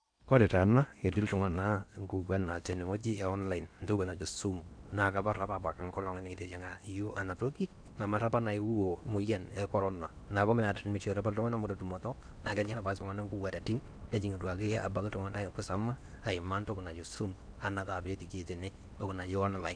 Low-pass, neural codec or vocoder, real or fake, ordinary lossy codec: 9.9 kHz; codec, 16 kHz in and 24 kHz out, 0.8 kbps, FocalCodec, streaming, 65536 codes; fake; none